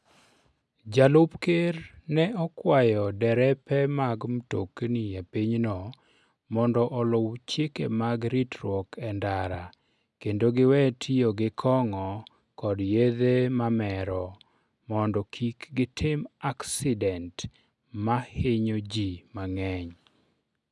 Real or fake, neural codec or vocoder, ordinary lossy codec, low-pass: real; none; none; none